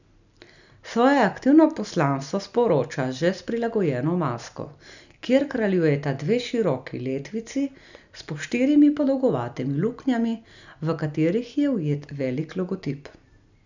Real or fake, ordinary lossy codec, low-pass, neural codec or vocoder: real; none; 7.2 kHz; none